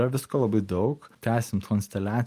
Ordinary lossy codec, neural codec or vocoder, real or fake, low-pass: Opus, 32 kbps; none; real; 14.4 kHz